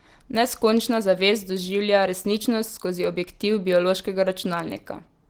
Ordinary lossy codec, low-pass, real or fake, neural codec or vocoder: Opus, 16 kbps; 19.8 kHz; real; none